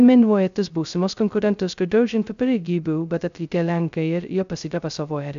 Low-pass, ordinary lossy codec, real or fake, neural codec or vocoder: 7.2 kHz; AAC, 96 kbps; fake; codec, 16 kHz, 0.2 kbps, FocalCodec